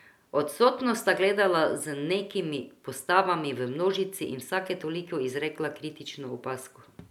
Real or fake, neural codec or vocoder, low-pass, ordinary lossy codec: real; none; 19.8 kHz; none